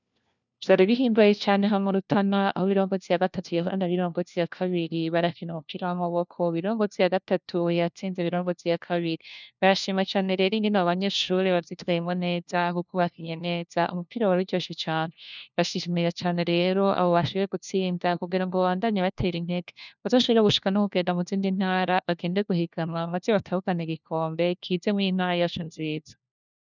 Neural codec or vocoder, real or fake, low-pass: codec, 16 kHz, 1 kbps, FunCodec, trained on LibriTTS, 50 frames a second; fake; 7.2 kHz